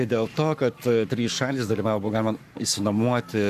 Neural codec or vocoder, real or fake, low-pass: codec, 44.1 kHz, 7.8 kbps, Pupu-Codec; fake; 14.4 kHz